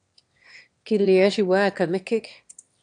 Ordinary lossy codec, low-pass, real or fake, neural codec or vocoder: AAC, 64 kbps; 9.9 kHz; fake; autoencoder, 22.05 kHz, a latent of 192 numbers a frame, VITS, trained on one speaker